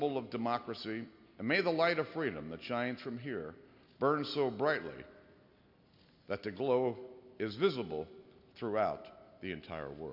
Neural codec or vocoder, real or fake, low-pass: none; real; 5.4 kHz